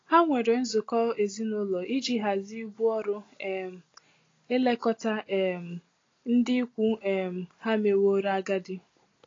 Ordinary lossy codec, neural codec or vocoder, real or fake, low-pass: AAC, 32 kbps; none; real; 7.2 kHz